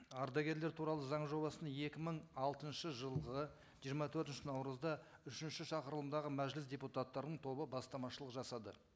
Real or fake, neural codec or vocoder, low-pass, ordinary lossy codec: real; none; none; none